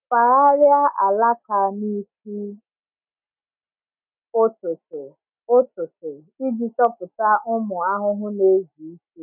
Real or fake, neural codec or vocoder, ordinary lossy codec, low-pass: real; none; none; 3.6 kHz